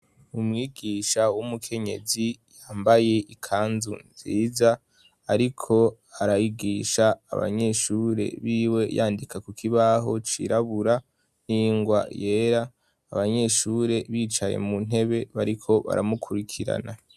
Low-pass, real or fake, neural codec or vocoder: 14.4 kHz; real; none